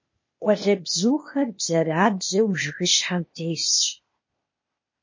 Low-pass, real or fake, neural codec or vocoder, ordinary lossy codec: 7.2 kHz; fake; codec, 16 kHz, 0.8 kbps, ZipCodec; MP3, 32 kbps